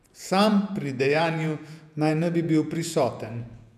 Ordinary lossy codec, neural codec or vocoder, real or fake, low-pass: none; vocoder, 48 kHz, 128 mel bands, Vocos; fake; 14.4 kHz